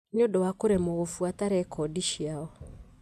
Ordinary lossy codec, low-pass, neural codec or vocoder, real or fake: none; 14.4 kHz; none; real